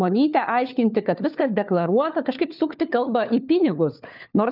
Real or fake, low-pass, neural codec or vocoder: fake; 5.4 kHz; codec, 16 kHz, 4 kbps, FunCodec, trained on LibriTTS, 50 frames a second